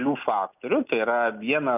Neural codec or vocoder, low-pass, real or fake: none; 3.6 kHz; real